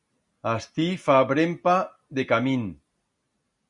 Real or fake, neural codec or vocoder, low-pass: real; none; 10.8 kHz